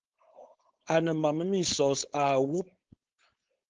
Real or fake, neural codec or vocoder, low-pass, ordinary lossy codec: fake; codec, 16 kHz, 4.8 kbps, FACodec; 7.2 kHz; Opus, 16 kbps